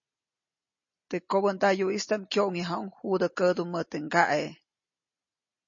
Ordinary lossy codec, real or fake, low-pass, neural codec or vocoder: MP3, 32 kbps; real; 7.2 kHz; none